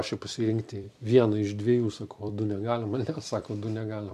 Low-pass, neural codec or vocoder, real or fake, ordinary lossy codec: 14.4 kHz; vocoder, 44.1 kHz, 128 mel bands every 256 samples, BigVGAN v2; fake; MP3, 96 kbps